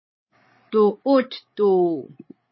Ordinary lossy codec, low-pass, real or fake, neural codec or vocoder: MP3, 24 kbps; 7.2 kHz; fake; codec, 16 kHz, 8 kbps, FreqCodec, larger model